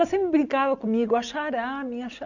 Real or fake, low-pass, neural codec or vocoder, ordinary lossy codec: real; 7.2 kHz; none; none